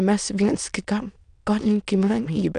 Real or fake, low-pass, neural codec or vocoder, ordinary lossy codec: fake; 9.9 kHz; autoencoder, 22.05 kHz, a latent of 192 numbers a frame, VITS, trained on many speakers; MP3, 96 kbps